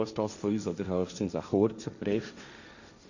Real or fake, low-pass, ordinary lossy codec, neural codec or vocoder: fake; 7.2 kHz; none; codec, 16 kHz, 1.1 kbps, Voila-Tokenizer